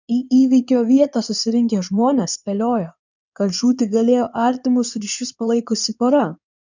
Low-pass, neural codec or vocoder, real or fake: 7.2 kHz; codec, 16 kHz in and 24 kHz out, 2.2 kbps, FireRedTTS-2 codec; fake